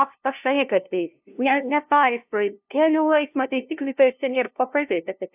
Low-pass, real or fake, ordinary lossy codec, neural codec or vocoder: 3.6 kHz; fake; AAC, 32 kbps; codec, 16 kHz, 0.5 kbps, FunCodec, trained on LibriTTS, 25 frames a second